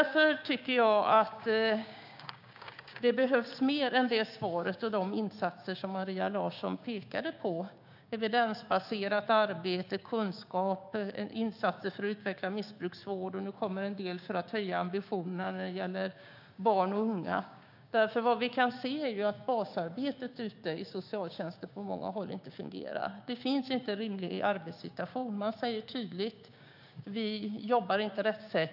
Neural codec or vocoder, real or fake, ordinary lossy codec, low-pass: codec, 16 kHz, 6 kbps, DAC; fake; AAC, 48 kbps; 5.4 kHz